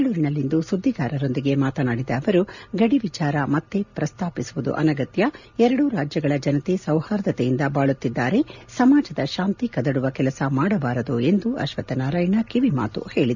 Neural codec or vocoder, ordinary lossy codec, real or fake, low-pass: none; none; real; 7.2 kHz